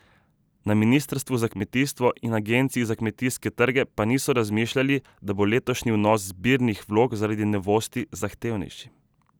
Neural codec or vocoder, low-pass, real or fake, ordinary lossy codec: none; none; real; none